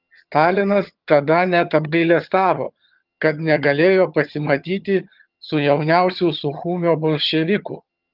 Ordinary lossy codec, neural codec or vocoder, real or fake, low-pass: Opus, 32 kbps; vocoder, 22.05 kHz, 80 mel bands, HiFi-GAN; fake; 5.4 kHz